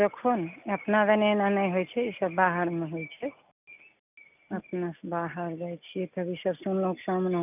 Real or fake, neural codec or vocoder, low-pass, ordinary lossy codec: real; none; 3.6 kHz; none